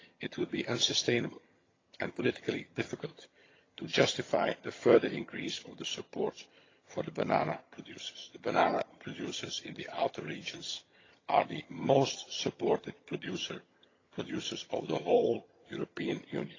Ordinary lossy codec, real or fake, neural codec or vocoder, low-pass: AAC, 32 kbps; fake; vocoder, 22.05 kHz, 80 mel bands, HiFi-GAN; 7.2 kHz